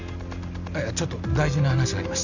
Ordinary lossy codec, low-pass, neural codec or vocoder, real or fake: none; 7.2 kHz; none; real